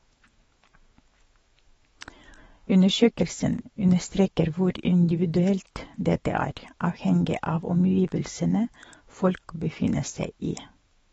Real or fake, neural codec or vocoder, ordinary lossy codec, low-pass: fake; autoencoder, 48 kHz, 128 numbers a frame, DAC-VAE, trained on Japanese speech; AAC, 24 kbps; 19.8 kHz